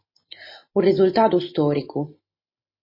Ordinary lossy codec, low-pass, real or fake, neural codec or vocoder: MP3, 24 kbps; 5.4 kHz; real; none